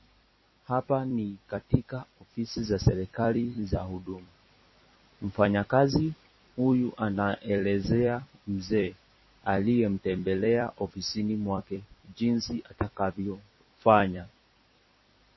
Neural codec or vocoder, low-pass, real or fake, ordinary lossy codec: vocoder, 44.1 kHz, 128 mel bands every 256 samples, BigVGAN v2; 7.2 kHz; fake; MP3, 24 kbps